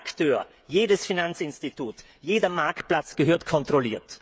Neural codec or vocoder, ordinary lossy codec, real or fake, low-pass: codec, 16 kHz, 8 kbps, FreqCodec, smaller model; none; fake; none